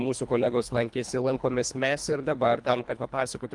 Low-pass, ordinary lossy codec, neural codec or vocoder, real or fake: 10.8 kHz; Opus, 32 kbps; codec, 24 kHz, 1.5 kbps, HILCodec; fake